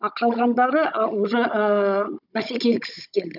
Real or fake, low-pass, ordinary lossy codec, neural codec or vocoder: fake; 5.4 kHz; none; codec, 16 kHz, 16 kbps, FunCodec, trained on Chinese and English, 50 frames a second